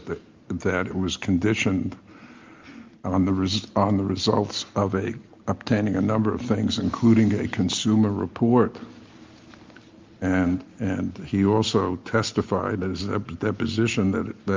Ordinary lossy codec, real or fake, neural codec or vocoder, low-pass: Opus, 24 kbps; real; none; 7.2 kHz